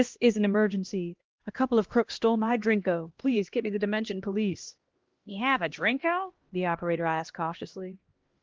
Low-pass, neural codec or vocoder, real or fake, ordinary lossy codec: 7.2 kHz; codec, 16 kHz, 1 kbps, X-Codec, HuBERT features, trained on LibriSpeech; fake; Opus, 16 kbps